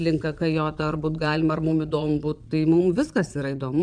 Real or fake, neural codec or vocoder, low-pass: fake; vocoder, 22.05 kHz, 80 mel bands, Vocos; 9.9 kHz